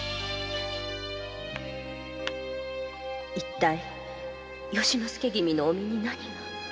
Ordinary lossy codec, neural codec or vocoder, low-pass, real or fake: none; none; none; real